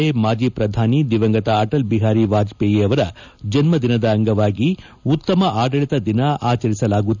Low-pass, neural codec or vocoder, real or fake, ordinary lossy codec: 7.2 kHz; none; real; none